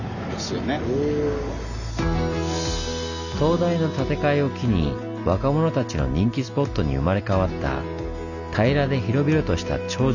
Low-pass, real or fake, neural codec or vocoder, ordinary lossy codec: 7.2 kHz; real; none; none